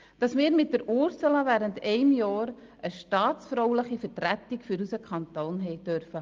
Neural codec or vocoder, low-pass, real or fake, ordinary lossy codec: none; 7.2 kHz; real; Opus, 32 kbps